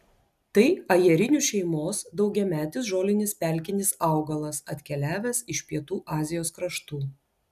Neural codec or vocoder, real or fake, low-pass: none; real; 14.4 kHz